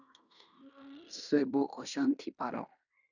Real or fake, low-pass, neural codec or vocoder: fake; 7.2 kHz; codec, 16 kHz in and 24 kHz out, 0.9 kbps, LongCat-Audio-Codec, fine tuned four codebook decoder